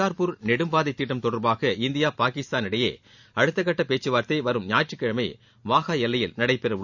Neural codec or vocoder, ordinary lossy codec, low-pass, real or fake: none; none; 7.2 kHz; real